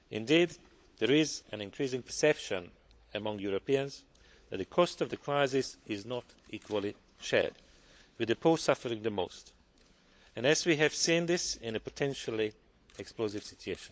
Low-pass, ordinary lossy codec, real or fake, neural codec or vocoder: none; none; fake; codec, 16 kHz, 16 kbps, FunCodec, trained on LibriTTS, 50 frames a second